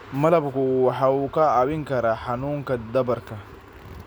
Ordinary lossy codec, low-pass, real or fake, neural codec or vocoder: none; none; real; none